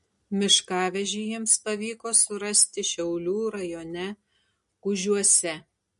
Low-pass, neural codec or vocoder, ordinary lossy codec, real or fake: 14.4 kHz; none; MP3, 48 kbps; real